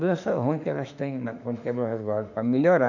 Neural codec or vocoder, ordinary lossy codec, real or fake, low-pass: autoencoder, 48 kHz, 32 numbers a frame, DAC-VAE, trained on Japanese speech; none; fake; 7.2 kHz